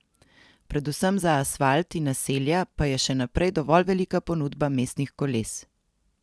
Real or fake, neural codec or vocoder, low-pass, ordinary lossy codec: real; none; none; none